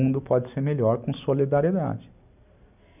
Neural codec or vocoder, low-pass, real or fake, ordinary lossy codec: none; 3.6 kHz; real; none